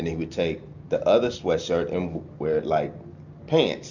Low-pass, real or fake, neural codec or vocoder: 7.2 kHz; real; none